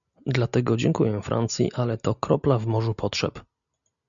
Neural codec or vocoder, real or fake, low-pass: none; real; 7.2 kHz